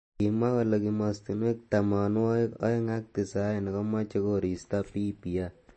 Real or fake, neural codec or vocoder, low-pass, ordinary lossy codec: real; none; 9.9 kHz; MP3, 32 kbps